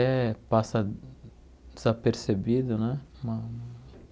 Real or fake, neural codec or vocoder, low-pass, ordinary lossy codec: real; none; none; none